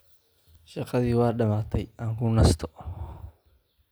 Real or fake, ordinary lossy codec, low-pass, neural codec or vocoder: real; none; none; none